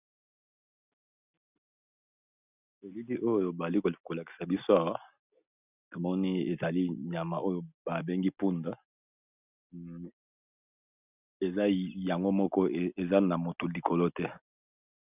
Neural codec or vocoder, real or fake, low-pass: none; real; 3.6 kHz